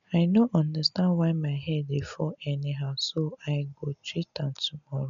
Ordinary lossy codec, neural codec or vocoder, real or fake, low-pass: MP3, 64 kbps; none; real; 7.2 kHz